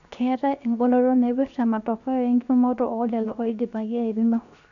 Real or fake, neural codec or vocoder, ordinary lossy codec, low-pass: fake; codec, 16 kHz, 0.7 kbps, FocalCodec; none; 7.2 kHz